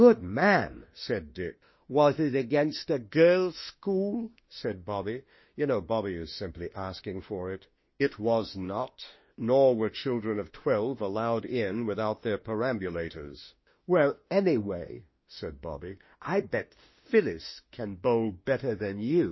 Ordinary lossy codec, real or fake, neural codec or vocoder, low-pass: MP3, 24 kbps; fake; autoencoder, 48 kHz, 32 numbers a frame, DAC-VAE, trained on Japanese speech; 7.2 kHz